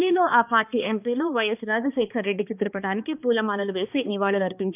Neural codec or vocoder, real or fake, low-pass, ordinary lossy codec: codec, 16 kHz, 2 kbps, X-Codec, HuBERT features, trained on balanced general audio; fake; 3.6 kHz; none